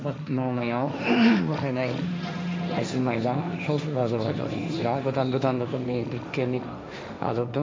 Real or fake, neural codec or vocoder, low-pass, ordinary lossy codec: fake; codec, 16 kHz, 1.1 kbps, Voila-Tokenizer; none; none